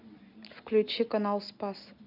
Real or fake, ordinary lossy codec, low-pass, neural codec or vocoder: real; none; 5.4 kHz; none